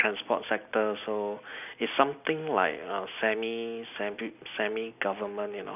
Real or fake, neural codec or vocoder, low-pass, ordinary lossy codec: real; none; 3.6 kHz; none